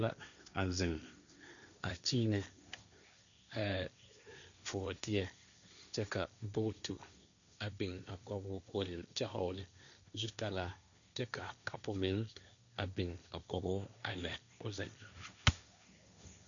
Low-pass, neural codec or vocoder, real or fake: 7.2 kHz; codec, 16 kHz, 1.1 kbps, Voila-Tokenizer; fake